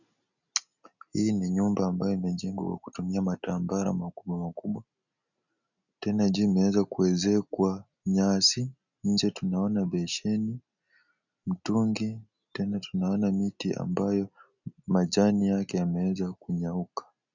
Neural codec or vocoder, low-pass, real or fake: none; 7.2 kHz; real